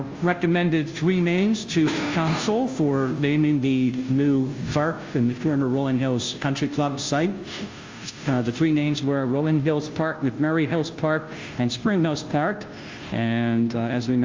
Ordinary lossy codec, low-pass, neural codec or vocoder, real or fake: Opus, 32 kbps; 7.2 kHz; codec, 16 kHz, 0.5 kbps, FunCodec, trained on Chinese and English, 25 frames a second; fake